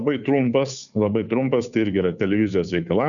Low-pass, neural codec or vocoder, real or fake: 7.2 kHz; codec, 16 kHz, 2 kbps, FunCodec, trained on Chinese and English, 25 frames a second; fake